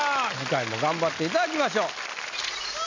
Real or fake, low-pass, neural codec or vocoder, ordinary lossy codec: real; 7.2 kHz; none; MP3, 64 kbps